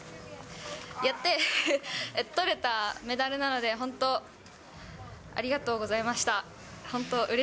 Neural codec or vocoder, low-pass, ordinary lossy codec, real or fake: none; none; none; real